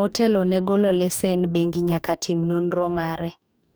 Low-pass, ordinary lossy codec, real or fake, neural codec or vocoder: none; none; fake; codec, 44.1 kHz, 2.6 kbps, DAC